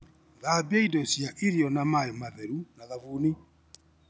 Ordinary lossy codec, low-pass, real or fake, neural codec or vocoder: none; none; real; none